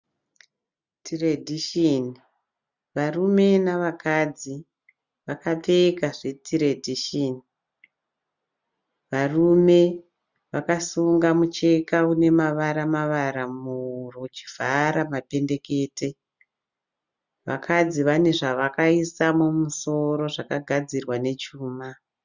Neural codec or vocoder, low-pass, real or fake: none; 7.2 kHz; real